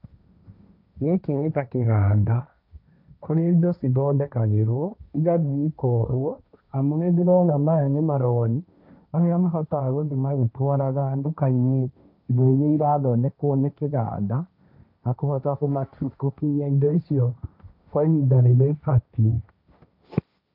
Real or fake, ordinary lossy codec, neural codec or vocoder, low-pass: fake; none; codec, 16 kHz, 1.1 kbps, Voila-Tokenizer; 5.4 kHz